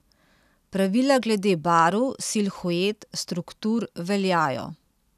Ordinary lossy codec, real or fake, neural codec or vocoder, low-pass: none; real; none; 14.4 kHz